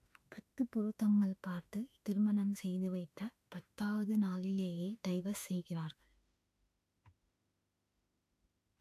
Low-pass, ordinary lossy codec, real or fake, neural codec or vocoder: 14.4 kHz; AAC, 64 kbps; fake; autoencoder, 48 kHz, 32 numbers a frame, DAC-VAE, trained on Japanese speech